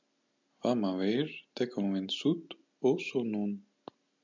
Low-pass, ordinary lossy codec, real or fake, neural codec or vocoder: 7.2 kHz; MP3, 64 kbps; real; none